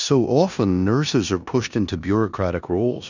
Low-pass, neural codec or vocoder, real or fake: 7.2 kHz; codec, 16 kHz in and 24 kHz out, 0.9 kbps, LongCat-Audio-Codec, four codebook decoder; fake